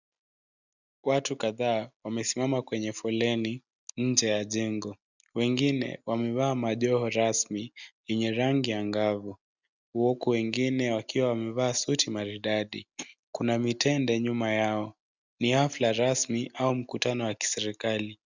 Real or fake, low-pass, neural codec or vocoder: real; 7.2 kHz; none